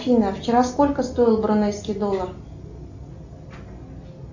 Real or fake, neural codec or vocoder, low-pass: real; none; 7.2 kHz